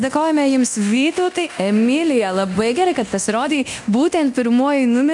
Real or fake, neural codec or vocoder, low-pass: fake; codec, 24 kHz, 0.9 kbps, DualCodec; 10.8 kHz